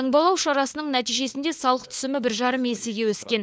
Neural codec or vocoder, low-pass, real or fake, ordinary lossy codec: codec, 16 kHz, 4 kbps, FunCodec, trained on LibriTTS, 50 frames a second; none; fake; none